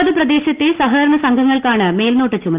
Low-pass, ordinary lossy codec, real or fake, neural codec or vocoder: 3.6 kHz; Opus, 32 kbps; real; none